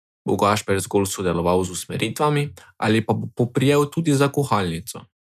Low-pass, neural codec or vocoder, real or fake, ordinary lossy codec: 14.4 kHz; none; real; none